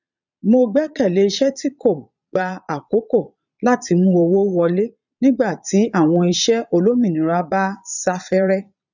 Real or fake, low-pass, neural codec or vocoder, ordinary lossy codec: fake; 7.2 kHz; vocoder, 22.05 kHz, 80 mel bands, Vocos; none